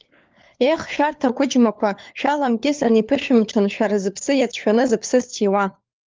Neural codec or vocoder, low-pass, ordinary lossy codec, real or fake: codec, 16 kHz, 4 kbps, FunCodec, trained on LibriTTS, 50 frames a second; 7.2 kHz; Opus, 24 kbps; fake